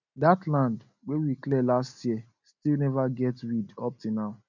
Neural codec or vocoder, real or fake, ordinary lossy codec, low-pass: none; real; none; 7.2 kHz